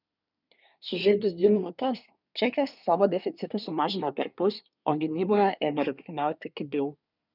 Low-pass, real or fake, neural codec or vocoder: 5.4 kHz; fake; codec, 24 kHz, 1 kbps, SNAC